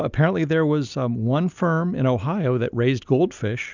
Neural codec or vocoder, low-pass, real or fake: none; 7.2 kHz; real